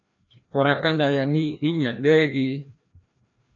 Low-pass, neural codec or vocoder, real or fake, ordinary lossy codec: 7.2 kHz; codec, 16 kHz, 1 kbps, FreqCodec, larger model; fake; AAC, 48 kbps